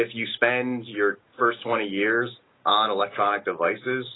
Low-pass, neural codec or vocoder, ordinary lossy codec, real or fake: 7.2 kHz; none; AAC, 16 kbps; real